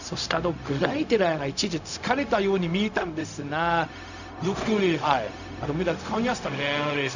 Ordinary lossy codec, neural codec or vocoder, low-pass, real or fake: none; codec, 16 kHz, 0.4 kbps, LongCat-Audio-Codec; 7.2 kHz; fake